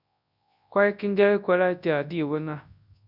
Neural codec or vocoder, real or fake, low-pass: codec, 24 kHz, 0.9 kbps, WavTokenizer, large speech release; fake; 5.4 kHz